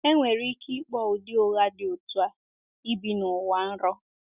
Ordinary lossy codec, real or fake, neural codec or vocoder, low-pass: Opus, 64 kbps; real; none; 3.6 kHz